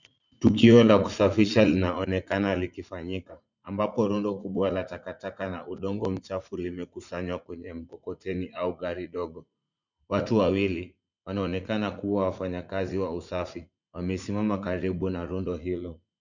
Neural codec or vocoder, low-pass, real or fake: vocoder, 44.1 kHz, 80 mel bands, Vocos; 7.2 kHz; fake